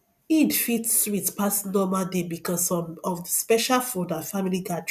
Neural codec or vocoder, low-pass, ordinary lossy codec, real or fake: none; 14.4 kHz; none; real